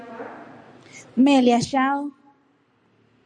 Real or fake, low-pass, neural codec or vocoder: real; 9.9 kHz; none